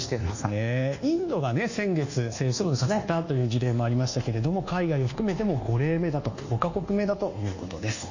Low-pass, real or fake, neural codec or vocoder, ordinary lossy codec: 7.2 kHz; fake; codec, 24 kHz, 1.2 kbps, DualCodec; none